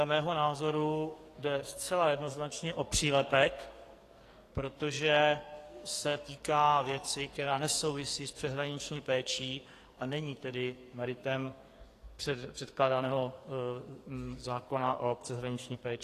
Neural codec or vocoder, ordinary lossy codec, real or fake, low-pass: codec, 44.1 kHz, 2.6 kbps, SNAC; AAC, 48 kbps; fake; 14.4 kHz